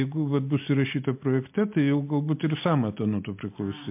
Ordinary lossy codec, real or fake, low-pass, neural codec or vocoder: MP3, 32 kbps; real; 3.6 kHz; none